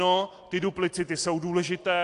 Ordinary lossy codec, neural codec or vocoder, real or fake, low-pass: AAC, 48 kbps; none; real; 10.8 kHz